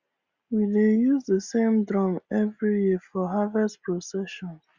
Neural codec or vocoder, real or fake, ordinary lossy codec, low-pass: none; real; Opus, 64 kbps; 7.2 kHz